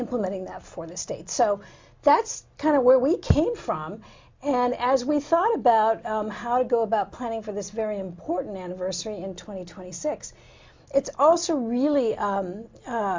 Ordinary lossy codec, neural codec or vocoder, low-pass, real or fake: MP3, 64 kbps; none; 7.2 kHz; real